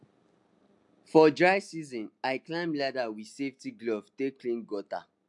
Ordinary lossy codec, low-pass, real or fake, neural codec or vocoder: MP3, 64 kbps; 10.8 kHz; real; none